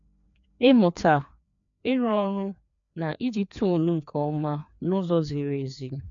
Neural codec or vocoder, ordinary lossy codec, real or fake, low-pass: codec, 16 kHz, 2 kbps, FreqCodec, larger model; MP3, 64 kbps; fake; 7.2 kHz